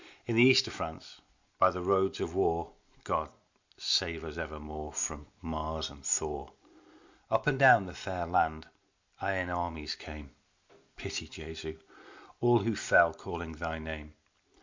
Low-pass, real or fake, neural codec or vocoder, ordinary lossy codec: 7.2 kHz; real; none; MP3, 64 kbps